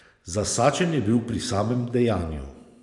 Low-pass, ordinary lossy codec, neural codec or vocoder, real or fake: 10.8 kHz; none; vocoder, 24 kHz, 100 mel bands, Vocos; fake